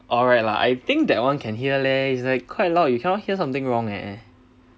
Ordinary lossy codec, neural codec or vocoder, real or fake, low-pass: none; none; real; none